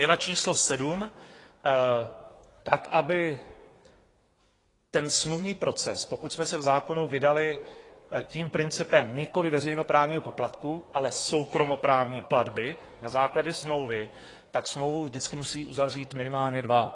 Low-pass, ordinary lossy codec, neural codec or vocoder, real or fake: 10.8 kHz; AAC, 32 kbps; codec, 24 kHz, 1 kbps, SNAC; fake